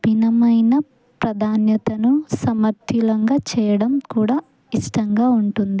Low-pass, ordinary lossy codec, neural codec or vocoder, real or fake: none; none; none; real